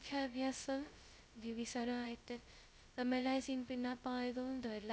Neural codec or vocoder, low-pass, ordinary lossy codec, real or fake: codec, 16 kHz, 0.2 kbps, FocalCodec; none; none; fake